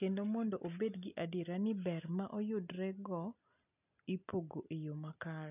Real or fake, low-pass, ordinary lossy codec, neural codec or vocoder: real; 3.6 kHz; none; none